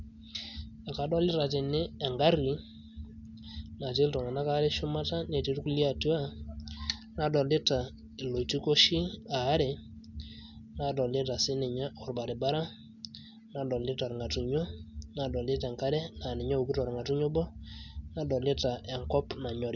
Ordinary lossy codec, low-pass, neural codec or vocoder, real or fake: none; 7.2 kHz; none; real